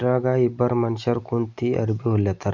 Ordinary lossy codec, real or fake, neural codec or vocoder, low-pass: none; real; none; 7.2 kHz